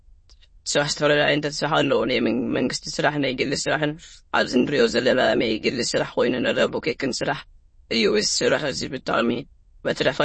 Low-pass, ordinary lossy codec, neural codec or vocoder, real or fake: 9.9 kHz; MP3, 32 kbps; autoencoder, 22.05 kHz, a latent of 192 numbers a frame, VITS, trained on many speakers; fake